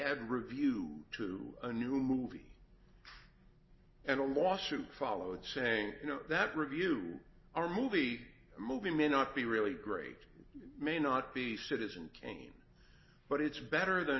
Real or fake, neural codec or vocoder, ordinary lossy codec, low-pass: real; none; MP3, 24 kbps; 7.2 kHz